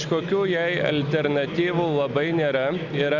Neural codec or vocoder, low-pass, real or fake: none; 7.2 kHz; real